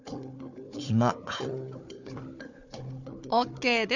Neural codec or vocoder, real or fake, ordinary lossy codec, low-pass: codec, 16 kHz, 4 kbps, FunCodec, trained on Chinese and English, 50 frames a second; fake; none; 7.2 kHz